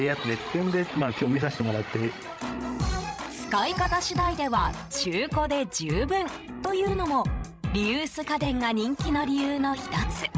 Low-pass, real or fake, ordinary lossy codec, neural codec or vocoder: none; fake; none; codec, 16 kHz, 16 kbps, FreqCodec, larger model